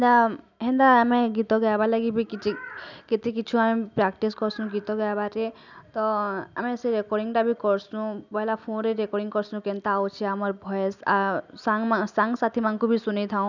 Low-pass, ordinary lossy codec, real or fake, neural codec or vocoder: 7.2 kHz; none; real; none